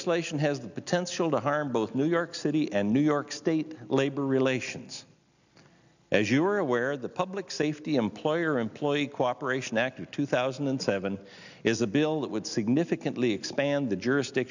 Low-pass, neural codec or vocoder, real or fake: 7.2 kHz; none; real